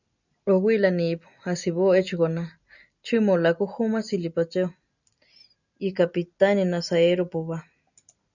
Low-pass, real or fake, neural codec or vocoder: 7.2 kHz; real; none